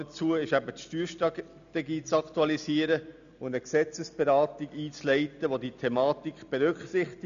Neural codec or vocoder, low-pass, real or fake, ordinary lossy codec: none; 7.2 kHz; real; none